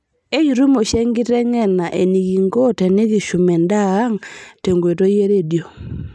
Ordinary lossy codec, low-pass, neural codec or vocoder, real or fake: none; none; none; real